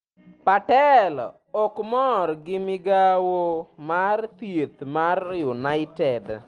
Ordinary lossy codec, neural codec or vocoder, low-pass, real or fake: Opus, 24 kbps; none; 9.9 kHz; real